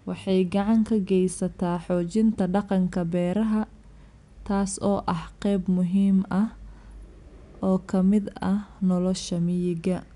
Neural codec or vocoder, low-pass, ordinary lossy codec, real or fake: none; 10.8 kHz; none; real